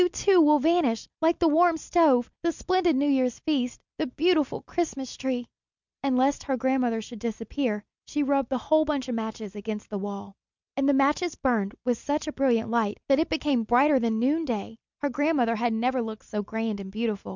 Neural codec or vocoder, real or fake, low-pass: none; real; 7.2 kHz